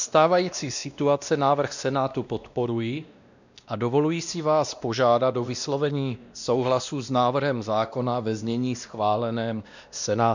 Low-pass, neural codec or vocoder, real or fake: 7.2 kHz; codec, 16 kHz, 1 kbps, X-Codec, WavLM features, trained on Multilingual LibriSpeech; fake